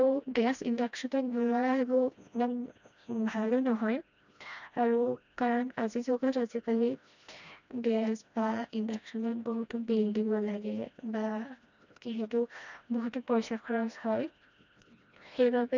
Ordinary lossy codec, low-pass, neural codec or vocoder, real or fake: none; 7.2 kHz; codec, 16 kHz, 1 kbps, FreqCodec, smaller model; fake